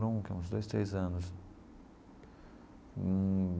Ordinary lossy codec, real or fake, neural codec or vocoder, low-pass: none; real; none; none